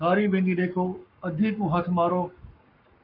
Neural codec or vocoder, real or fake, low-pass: codec, 44.1 kHz, 7.8 kbps, Pupu-Codec; fake; 5.4 kHz